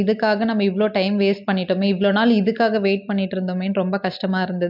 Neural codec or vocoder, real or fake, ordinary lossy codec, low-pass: none; real; MP3, 48 kbps; 5.4 kHz